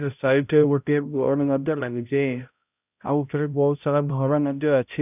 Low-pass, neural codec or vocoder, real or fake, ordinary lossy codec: 3.6 kHz; codec, 16 kHz, 0.5 kbps, X-Codec, HuBERT features, trained on balanced general audio; fake; none